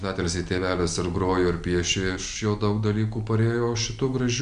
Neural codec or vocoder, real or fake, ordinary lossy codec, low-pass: none; real; MP3, 96 kbps; 9.9 kHz